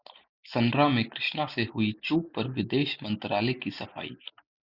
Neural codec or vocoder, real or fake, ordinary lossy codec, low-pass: none; real; Opus, 64 kbps; 5.4 kHz